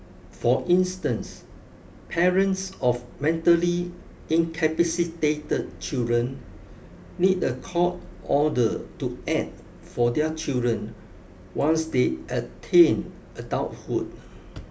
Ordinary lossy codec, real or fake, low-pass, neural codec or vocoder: none; real; none; none